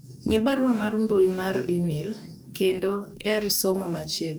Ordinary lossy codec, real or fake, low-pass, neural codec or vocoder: none; fake; none; codec, 44.1 kHz, 2.6 kbps, DAC